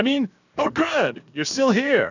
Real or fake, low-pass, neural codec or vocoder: fake; 7.2 kHz; codec, 16 kHz, 0.7 kbps, FocalCodec